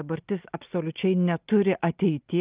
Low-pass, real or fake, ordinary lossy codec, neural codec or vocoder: 3.6 kHz; real; Opus, 32 kbps; none